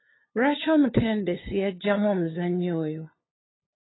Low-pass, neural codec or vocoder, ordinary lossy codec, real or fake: 7.2 kHz; codec, 16 kHz, 2 kbps, FunCodec, trained on LibriTTS, 25 frames a second; AAC, 16 kbps; fake